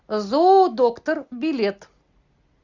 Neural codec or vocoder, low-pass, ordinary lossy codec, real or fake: none; 7.2 kHz; Opus, 64 kbps; real